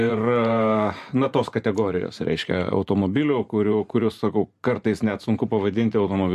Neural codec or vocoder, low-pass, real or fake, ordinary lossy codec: vocoder, 44.1 kHz, 128 mel bands every 512 samples, BigVGAN v2; 14.4 kHz; fake; MP3, 64 kbps